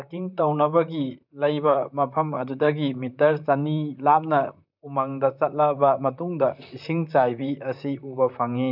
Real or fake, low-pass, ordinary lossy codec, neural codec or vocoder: fake; 5.4 kHz; none; vocoder, 44.1 kHz, 128 mel bands, Pupu-Vocoder